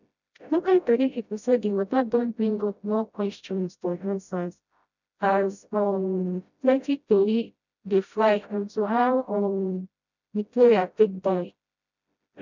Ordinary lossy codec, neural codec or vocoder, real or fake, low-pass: none; codec, 16 kHz, 0.5 kbps, FreqCodec, smaller model; fake; 7.2 kHz